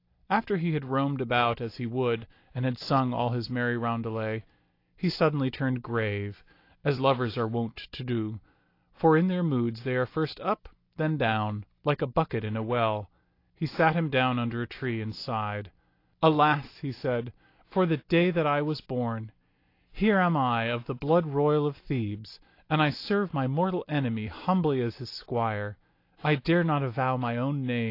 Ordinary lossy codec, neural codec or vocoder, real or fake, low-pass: AAC, 32 kbps; none; real; 5.4 kHz